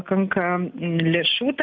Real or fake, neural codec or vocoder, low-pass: real; none; 7.2 kHz